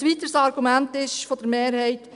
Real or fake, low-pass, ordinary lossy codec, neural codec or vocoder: real; 10.8 kHz; none; none